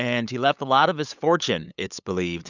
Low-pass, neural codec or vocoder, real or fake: 7.2 kHz; codec, 16 kHz, 8 kbps, FunCodec, trained on LibriTTS, 25 frames a second; fake